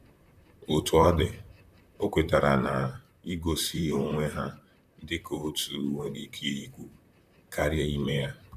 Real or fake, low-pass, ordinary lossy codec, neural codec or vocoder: fake; 14.4 kHz; none; vocoder, 44.1 kHz, 128 mel bands, Pupu-Vocoder